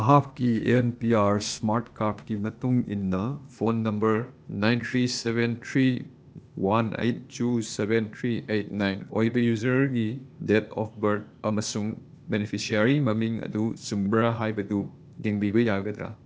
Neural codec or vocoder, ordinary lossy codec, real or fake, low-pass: codec, 16 kHz, 0.8 kbps, ZipCodec; none; fake; none